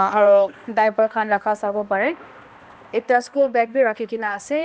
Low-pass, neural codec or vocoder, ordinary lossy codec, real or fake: none; codec, 16 kHz, 1 kbps, X-Codec, HuBERT features, trained on balanced general audio; none; fake